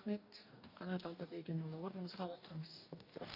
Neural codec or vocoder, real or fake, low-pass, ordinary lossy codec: codec, 44.1 kHz, 2.6 kbps, DAC; fake; 5.4 kHz; none